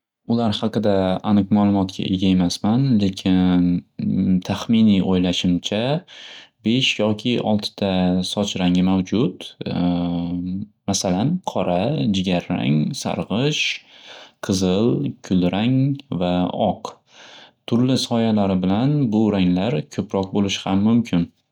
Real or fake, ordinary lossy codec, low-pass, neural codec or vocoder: real; none; 19.8 kHz; none